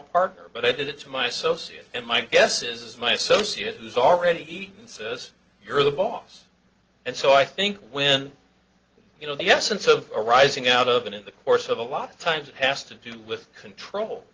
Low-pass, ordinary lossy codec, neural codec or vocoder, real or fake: 7.2 kHz; Opus, 16 kbps; none; real